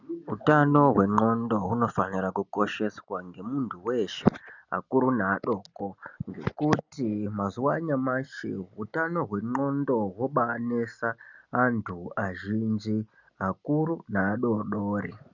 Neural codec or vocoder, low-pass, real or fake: none; 7.2 kHz; real